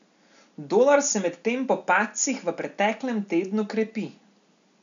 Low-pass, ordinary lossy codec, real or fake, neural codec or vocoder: 7.2 kHz; none; real; none